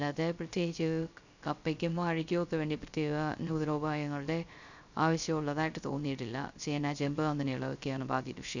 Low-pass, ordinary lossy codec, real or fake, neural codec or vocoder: 7.2 kHz; none; fake; codec, 16 kHz, 0.3 kbps, FocalCodec